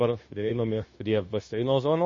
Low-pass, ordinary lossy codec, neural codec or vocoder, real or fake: 7.2 kHz; MP3, 32 kbps; codec, 16 kHz, 0.9 kbps, LongCat-Audio-Codec; fake